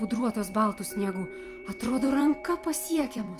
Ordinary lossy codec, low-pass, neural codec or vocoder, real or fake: Opus, 32 kbps; 14.4 kHz; vocoder, 44.1 kHz, 128 mel bands every 256 samples, BigVGAN v2; fake